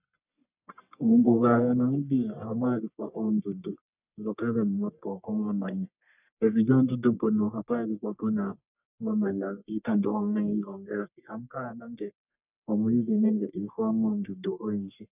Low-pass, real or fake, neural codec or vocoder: 3.6 kHz; fake; codec, 44.1 kHz, 1.7 kbps, Pupu-Codec